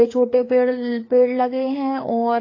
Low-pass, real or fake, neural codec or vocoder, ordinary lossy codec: 7.2 kHz; fake; codec, 16 kHz, 4 kbps, FreqCodec, larger model; AAC, 32 kbps